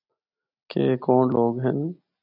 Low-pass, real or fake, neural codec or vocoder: 5.4 kHz; real; none